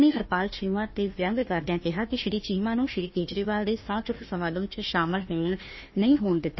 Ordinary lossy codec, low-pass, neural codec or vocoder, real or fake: MP3, 24 kbps; 7.2 kHz; codec, 16 kHz, 1 kbps, FunCodec, trained on Chinese and English, 50 frames a second; fake